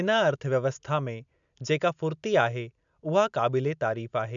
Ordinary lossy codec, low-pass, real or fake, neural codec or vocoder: MP3, 96 kbps; 7.2 kHz; real; none